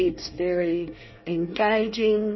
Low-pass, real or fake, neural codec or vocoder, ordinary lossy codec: 7.2 kHz; fake; codec, 24 kHz, 1 kbps, SNAC; MP3, 24 kbps